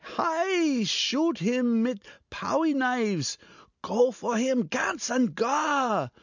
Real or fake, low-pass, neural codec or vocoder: real; 7.2 kHz; none